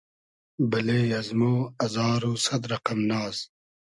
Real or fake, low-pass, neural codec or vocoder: real; 10.8 kHz; none